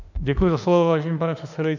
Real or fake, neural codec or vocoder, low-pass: fake; autoencoder, 48 kHz, 32 numbers a frame, DAC-VAE, trained on Japanese speech; 7.2 kHz